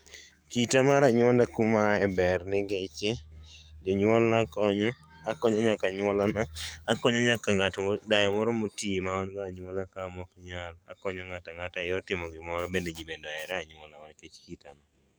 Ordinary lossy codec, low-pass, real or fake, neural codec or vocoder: none; none; fake; codec, 44.1 kHz, 7.8 kbps, DAC